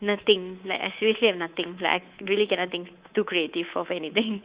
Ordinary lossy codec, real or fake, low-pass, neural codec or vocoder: Opus, 64 kbps; fake; 3.6 kHz; codec, 24 kHz, 3.1 kbps, DualCodec